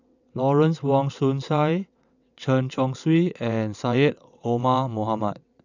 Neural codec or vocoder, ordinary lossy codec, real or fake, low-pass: vocoder, 22.05 kHz, 80 mel bands, WaveNeXt; none; fake; 7.2 kHz